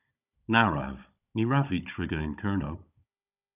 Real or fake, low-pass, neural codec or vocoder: fake; 3.6 kHz; codec, 16 kHz, 16 kbps, FunCodec, trained on Chinese and English, 50 frames a second